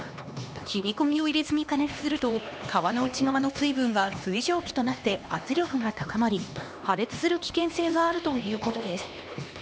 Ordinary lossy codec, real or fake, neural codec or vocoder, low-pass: none; fake; codec, 16 kHz, 2 kbps, X-Codec, HuBERT features, trained on LibriSpeech; none